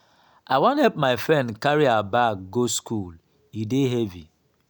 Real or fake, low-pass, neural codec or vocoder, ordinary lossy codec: real; none; none; none